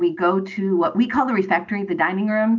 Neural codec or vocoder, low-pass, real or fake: none; 7.2 kHz; real